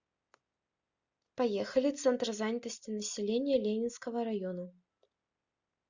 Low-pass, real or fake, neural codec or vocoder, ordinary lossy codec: 7.2 kHz; real; none; Opus, 64 kbps